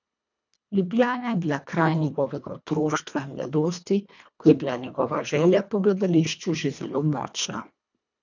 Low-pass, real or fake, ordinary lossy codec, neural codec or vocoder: 7.2 kHz; fake; none; codec, 24 kHz, 1.5 kbps, HILCodec